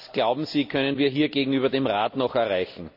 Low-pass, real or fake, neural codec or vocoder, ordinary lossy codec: 5.4 kHz; real; none; none